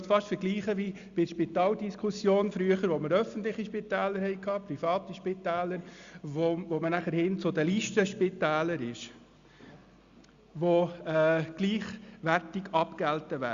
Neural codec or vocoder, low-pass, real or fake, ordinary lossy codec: none; 7.2 kHz; real; Opus, 64 kbps